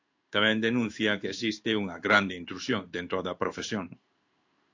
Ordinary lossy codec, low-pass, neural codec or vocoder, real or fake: AAC, 48 kbps; 7.2 kHz; codec, 16 kHz in and 24 kHz out, 1 kbps, XY-Tokenizer; fake